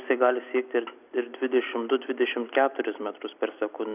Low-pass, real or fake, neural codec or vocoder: 3.6 kHz; real; none